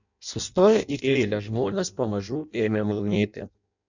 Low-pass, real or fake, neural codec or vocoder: 7.2 kHz; fake; codec, 16 kHz in and 24 kHz out, 0.6 kbps, FireRedTTS-2 codec